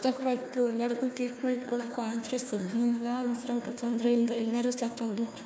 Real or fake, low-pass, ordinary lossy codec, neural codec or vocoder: fake; none; none; codec, 16 kHz, 1 kbps, FunCodec, trained on Chinese and English, 50 frames a second